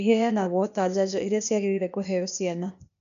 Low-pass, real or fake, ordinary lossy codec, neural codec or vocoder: 7.2 kHz; fake; none; codec, 16 kHz, 0.8 kbps, ZipCodec